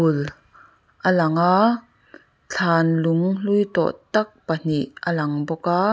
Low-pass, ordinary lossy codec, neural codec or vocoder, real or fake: none; none; none; real